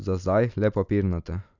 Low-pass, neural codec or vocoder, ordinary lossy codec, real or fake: 7.2 kHz; none; none; real